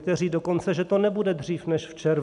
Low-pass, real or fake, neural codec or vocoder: 10.8 kHz; real; none